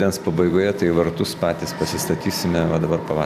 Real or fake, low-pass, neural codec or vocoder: real; 14.4 kHz; none